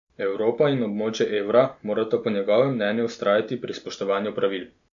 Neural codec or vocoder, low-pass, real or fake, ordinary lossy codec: none; 7.2 kHz; real; none